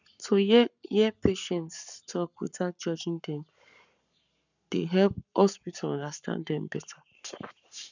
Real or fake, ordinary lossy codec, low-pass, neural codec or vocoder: fake; none; 7.2 kHz; codec, 44.1 kHz, 7.8 kbps, Pupu-Codec